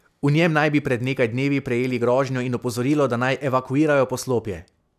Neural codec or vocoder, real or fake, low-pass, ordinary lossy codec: none; real; 14.4 kHz; none